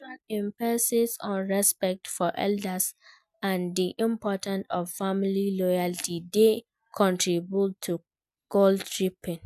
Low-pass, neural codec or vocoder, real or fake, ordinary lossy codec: 14.4 kHz; none; real; none